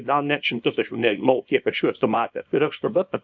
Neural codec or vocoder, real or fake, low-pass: codec, 24 kHz, 0.9 kbps, WavTokenizer, small release; fake; 7.2 kHz